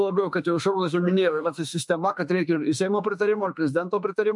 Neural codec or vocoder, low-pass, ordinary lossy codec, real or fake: autoencoder, 48 kHz, 32 numbers a frame, DAC-VAE, trained on Japanese speech; 10.8 kHz; MP3, 64 kbps; fake